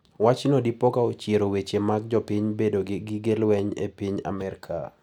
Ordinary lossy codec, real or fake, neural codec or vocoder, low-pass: none; real; none; 19.8 kHz